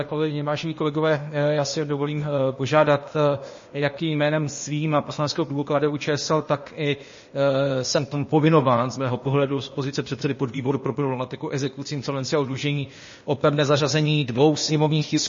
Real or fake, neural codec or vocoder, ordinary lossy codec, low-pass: fake; codec, 16 kHz, 0.8 kbps, ZipCodec; MP3, 32 kbps; 7.2 kHz